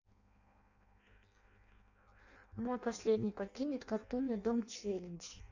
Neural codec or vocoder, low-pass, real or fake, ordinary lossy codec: codec, 16 kHz in and 24 kHz out, 0.6 kbps, FireRedTTS-2 codec; 7.2 kHz; fake; none